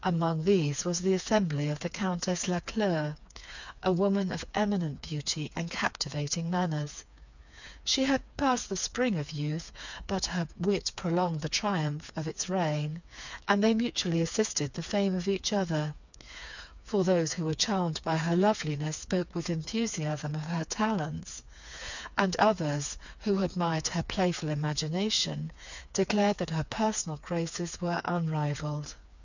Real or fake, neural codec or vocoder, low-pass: fake; codec, 16 kHz, 4 kbps, FreqCodec, smaller model; 7.2 kHz